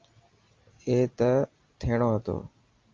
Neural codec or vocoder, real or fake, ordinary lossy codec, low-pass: none; real; Opus, 24 kbps; 7.2 kHz